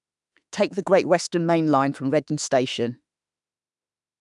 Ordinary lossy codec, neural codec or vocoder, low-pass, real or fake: none; autoencoder, 48 kHz, 32 numbers a frame, DAC-VAE, trained on Japanese speech; 10.8 kHz; fake